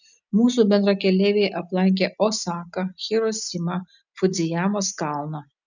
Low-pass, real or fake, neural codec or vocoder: 7.2 kHz; real; none